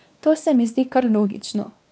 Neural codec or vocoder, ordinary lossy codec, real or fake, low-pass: codec, 16 kHz, 2 kbps, X-Codec, WavLM features, trained on Multilingual LibriSpeech; none; fake; none